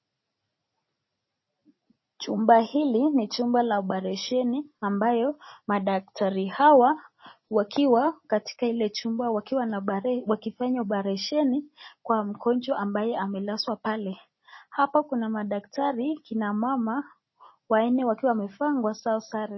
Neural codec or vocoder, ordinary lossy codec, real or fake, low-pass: none; MP3, 24 kbps; real; 7.2 kHz